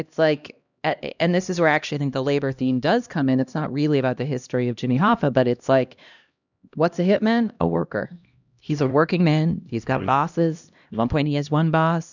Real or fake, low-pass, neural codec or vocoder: fake; 7.2 kHz; codec, 16 kHz, 1 kbps, X-Codec, HuBERT features, trained on LibriSpeech